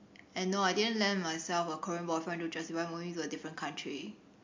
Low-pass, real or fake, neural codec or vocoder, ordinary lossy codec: 7.2 kHz; real; none; MP3, 48 kbps